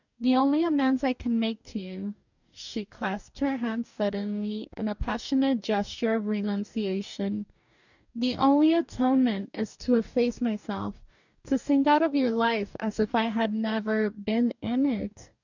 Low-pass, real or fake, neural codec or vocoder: 7.2 kHz; fake; codec, 44.1 kHz, 2.6 kbps, DAC